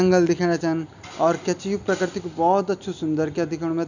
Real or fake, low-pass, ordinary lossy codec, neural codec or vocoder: real; 7.2 kHz; none; none